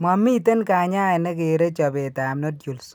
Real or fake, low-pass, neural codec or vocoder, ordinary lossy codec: real; none; none; none